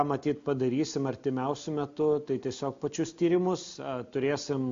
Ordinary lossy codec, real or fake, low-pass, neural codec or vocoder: MP3, 48 kbps; real; 7.2 kHz; none